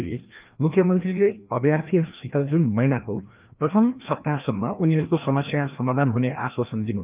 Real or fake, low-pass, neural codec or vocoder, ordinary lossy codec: fake; 3.6 kHz; codec, 16 kHz, 1 kbps, FreqCodec, larger model; Opus, 32 kbps